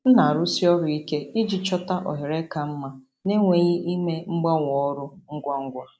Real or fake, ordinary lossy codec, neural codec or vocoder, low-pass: real; none; none; none